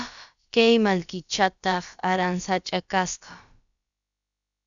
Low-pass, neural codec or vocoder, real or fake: 7.2 kHz; codec, 16 kHz, about 1 kbps, DyCAST, with the encoder's durations; fake